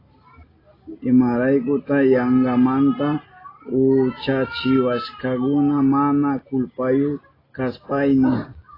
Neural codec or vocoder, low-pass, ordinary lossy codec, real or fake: none; 5.4 kHz; AAC, 24 kbps; real